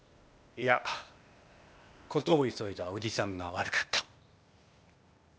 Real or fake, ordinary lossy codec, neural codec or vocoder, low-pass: fake; none; codec, 16 kHz, 0.8 kbps, ZipCodec; none